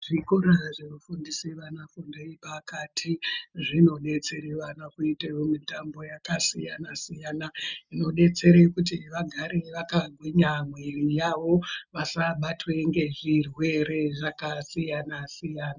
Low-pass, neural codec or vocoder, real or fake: 7.2 kHz; none; real